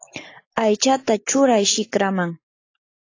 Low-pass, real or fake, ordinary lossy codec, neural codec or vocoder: 7.2 kHz; real; AAC, 32 kbps; none